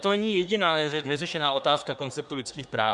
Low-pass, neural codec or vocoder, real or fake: 10.8 kHz; codec, 24 kHz, 1 kbps, SNAC; fake